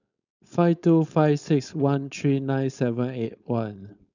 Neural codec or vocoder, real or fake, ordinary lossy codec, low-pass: codec, 16 kHz, 4.8 kbps, FACodec; fake; none; 7.2 kHz